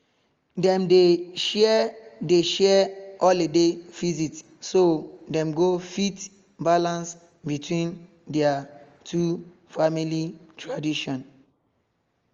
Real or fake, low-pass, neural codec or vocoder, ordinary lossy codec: real; 7.2 kHz; none; Opus, 32 kbps